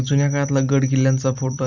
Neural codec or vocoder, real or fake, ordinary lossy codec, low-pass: none; real; none; 7.2 kHz